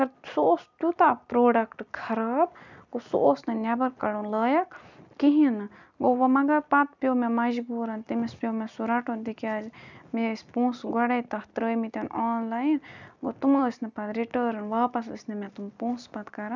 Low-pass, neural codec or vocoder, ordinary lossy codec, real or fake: 7.2 kHz; none; none; real